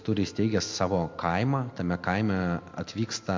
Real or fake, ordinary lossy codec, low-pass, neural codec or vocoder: real; MP3, 64 kbps; 7.2 kHz; none